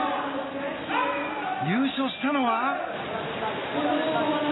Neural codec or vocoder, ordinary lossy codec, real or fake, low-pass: none; AAC, 16 kbps; real; 7.2 kHz